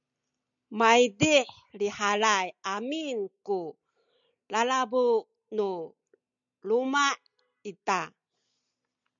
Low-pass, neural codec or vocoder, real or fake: 7.2 kHz; none; real